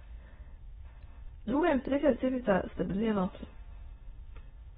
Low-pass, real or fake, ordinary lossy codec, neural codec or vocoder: 9.9 kHz; fake; AAC, 16 kbps; autoencoder, 22.05 kHz, a latent of 192 numbers a frame, VITS, trained on many speakers